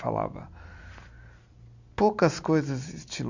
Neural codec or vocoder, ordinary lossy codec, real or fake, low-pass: none; Opus, 64 kbps; real; 7.2 kHz